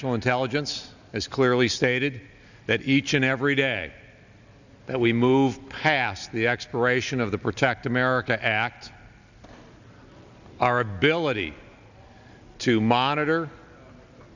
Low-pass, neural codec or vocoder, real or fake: 7.2 kHz; none; real